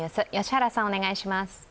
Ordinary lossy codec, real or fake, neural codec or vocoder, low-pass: none; real; none; none